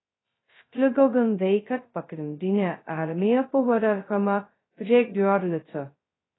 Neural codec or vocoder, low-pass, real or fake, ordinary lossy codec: codec, 16 kHz, 0.2 kbps, FocalCodec; 7.2 kHz; fake; AAC, 16 kbps